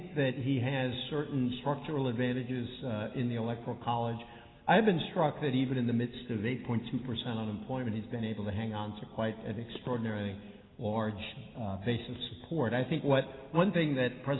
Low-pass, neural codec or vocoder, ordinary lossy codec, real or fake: 7.2 kHz; none; AAC, 16 kbps; real